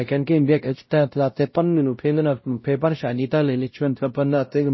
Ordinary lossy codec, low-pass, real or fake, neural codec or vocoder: MP3, 24 kbps; 7.2 kHz; fake; codec, 16 kHz, 0.5 kbps, X-Codec, WavLM features, trained on Multilingual LibriSpeech